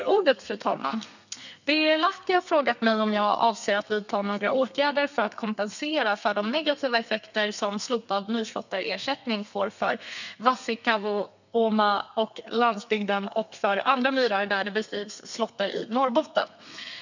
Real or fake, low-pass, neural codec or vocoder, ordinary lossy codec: fake; 7.2 kHz; codec, 32 kHz, 1.9 kbps, SNAC; none